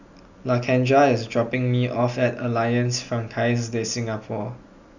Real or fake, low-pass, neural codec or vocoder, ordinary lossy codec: real; 7.2 kHz; none; none